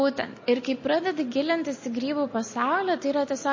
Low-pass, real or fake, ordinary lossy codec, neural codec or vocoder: 7.2 kHz; real; MP3, 32 kbps; none